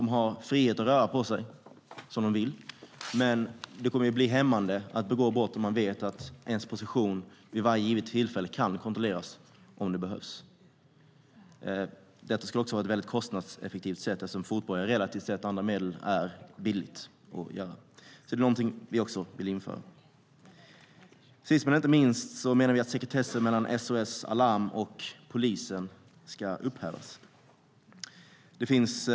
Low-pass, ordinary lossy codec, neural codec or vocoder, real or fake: none; none; none; real